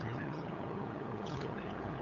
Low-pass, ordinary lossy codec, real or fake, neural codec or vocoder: 7.2 kHz; none; fake; codec, 16 kHz, 8 kbps, FunCodec, trained on LibriTTS, 25 frames a second